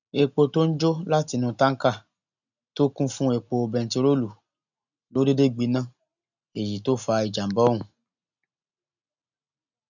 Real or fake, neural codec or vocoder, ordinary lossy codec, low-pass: real; none; none; 7.2 kHz